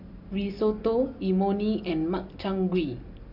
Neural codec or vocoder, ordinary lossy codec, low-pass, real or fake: none; none; 5.4 kHz; real